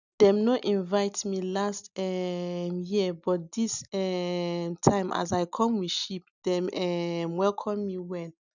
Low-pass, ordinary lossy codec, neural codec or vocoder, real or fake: 7.2 kHz; none; none; real